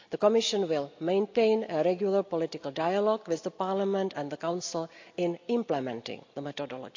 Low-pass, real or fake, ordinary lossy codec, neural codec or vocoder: 7.2 kHz; real; AAC, 48 kbps; none